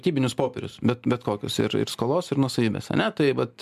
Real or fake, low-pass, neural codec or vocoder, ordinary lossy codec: real; 14.4 kHz; none; MP3, 96 kbps